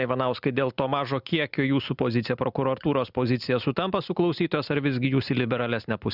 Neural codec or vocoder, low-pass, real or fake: none; 5.4 kHz; real